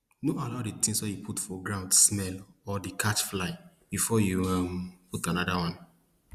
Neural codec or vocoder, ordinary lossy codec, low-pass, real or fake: none; Opus, 64 kbps; 14.4 kHz; real